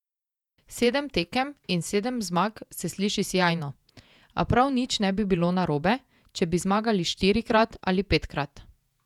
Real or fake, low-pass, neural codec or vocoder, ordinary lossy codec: fake; 19.8 kHz; vocoder, 48 kHz, 128 mel bands, Vocos; none